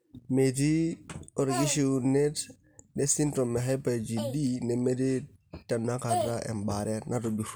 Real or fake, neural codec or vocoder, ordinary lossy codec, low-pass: real; none; none; none